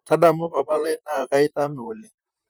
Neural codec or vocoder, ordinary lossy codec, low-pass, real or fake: vocoder, 44.1 kHz, 128 mel bands, Pupu-Vocoder; none; none; fake